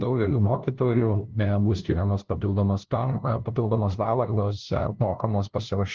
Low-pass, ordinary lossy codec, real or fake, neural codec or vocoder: 7.2 kHz; Opus, 16 kbps; fake; codec, 16 kHz, 0.5 kbps, FunCodec, trained on LibriTTS, 25 frames a second